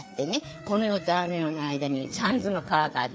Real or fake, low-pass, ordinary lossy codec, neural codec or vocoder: fake; none; none; codec, 16 kHz, 4 kbps, FreqCodec, larger model